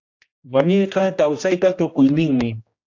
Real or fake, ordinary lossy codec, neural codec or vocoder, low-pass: fake; AAC, 48 kbps; codec, 16 kHz, 1 kbps, X-Codec, HuBERT features, trained on general audio; 7.2 kHz